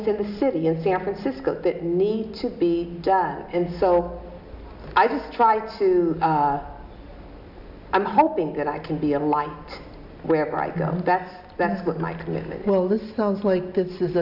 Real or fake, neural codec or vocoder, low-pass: real; none; 5.4 kHz